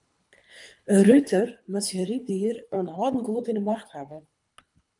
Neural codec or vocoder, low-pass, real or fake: codec, 24 kHz, 3 kbps, HILCodec; 10.8 kHz; fake